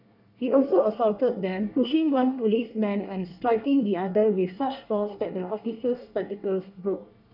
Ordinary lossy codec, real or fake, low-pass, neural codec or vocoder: none; fake; 5.4 kHz; codec, 24 kHz, 1 kbps, SNAC